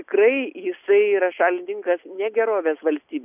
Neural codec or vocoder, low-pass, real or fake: none; 3.6 kHz; real